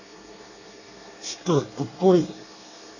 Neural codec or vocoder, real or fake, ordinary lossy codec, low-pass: codec, 24 kHz, 1 kbps, SNAC; fake; none; 7.2 kHz